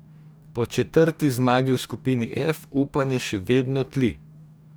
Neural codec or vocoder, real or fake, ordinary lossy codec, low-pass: codec, 44.1 kHz, 2.6 kbps, DAC; fake; none; none